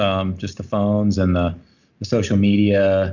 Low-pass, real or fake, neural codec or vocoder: 7.2 kHz; real; none